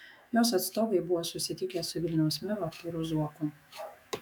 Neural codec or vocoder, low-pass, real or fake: autoencoder, 48 kHz, 128 numbers a frame, DAC-VAE, trained on Japanese speech; 19.8 kHz; fake